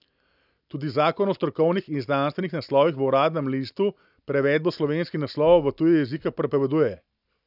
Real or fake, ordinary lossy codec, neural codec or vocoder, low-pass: real; none; none; 5.4 kHz